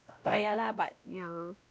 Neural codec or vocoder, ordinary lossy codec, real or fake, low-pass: codec, 16 kHz, 1 kbps, X-Codec, WavLM features, trained on Multilingual LibriSpeech; none; fake; none